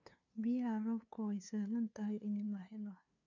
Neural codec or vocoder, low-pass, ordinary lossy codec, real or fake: codec, 16 kHz, 2 kbps, FunCodec, trained on LibriTTS, 25 frames a second; 7.2 kHz; none; fake